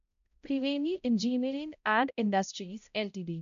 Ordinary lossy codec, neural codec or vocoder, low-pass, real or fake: none; codec, 16 kHz, 0.5 kbps, X-Codec, HuBERT features, trained on balanced general audio; 7.2 kHz; fake